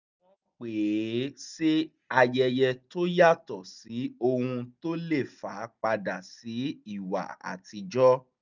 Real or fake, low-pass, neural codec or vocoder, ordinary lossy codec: real; 7.2 kHz; none; none